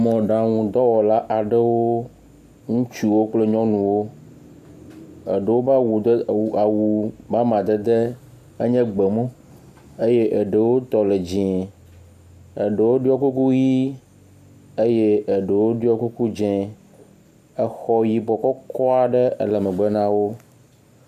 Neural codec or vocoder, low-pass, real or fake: none; 14.4 kHz; real